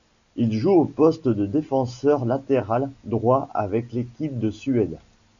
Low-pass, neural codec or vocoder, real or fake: 7.2 kHz; none; real